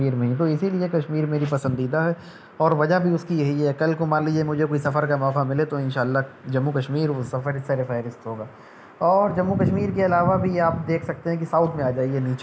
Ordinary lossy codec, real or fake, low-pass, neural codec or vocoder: none; real; none; none